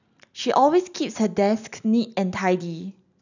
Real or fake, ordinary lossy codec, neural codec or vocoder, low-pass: real; none; none; 7.2 kHz